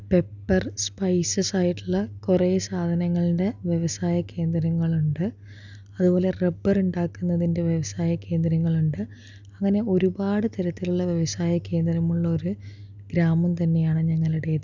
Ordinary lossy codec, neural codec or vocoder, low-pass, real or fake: none; none; 7.2 kHz; real